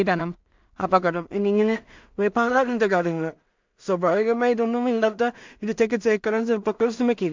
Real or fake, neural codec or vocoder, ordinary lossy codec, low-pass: fake; codec, 16 kHz in and 24 kHz out, 0.4 kbps, LongCat-Audio-Codec, two codebook decoder; MP3, 64 kbps; 7.2 kHz